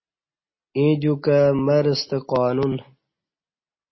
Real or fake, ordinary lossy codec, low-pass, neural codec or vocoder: real; MP3, 24 kbps; 7.2 kHz; none